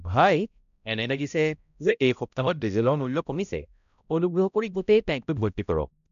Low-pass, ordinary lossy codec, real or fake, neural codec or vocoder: 7.2 kHz; none; fake; codec, 16 kHz, 0.5 kbps, X-Codec, HuBERT features, trained on balanced general audio